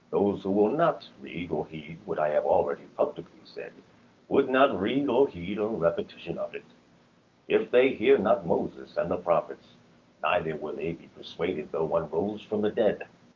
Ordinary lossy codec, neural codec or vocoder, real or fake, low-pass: Opus, 24 kbps; codec, 16 kHz, 6 kbps, DAC; fake; 7.2 kHz